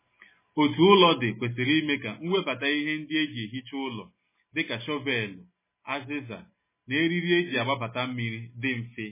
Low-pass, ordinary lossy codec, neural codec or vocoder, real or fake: 3.6 kHz; MP3, 16 kbps; none; real